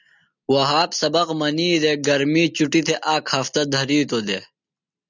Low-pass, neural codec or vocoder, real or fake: 7.2 kHz; none; real